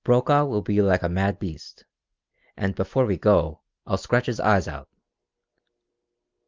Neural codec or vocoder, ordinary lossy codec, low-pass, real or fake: none; Opus, 32 kbps; 7.2 kHz; real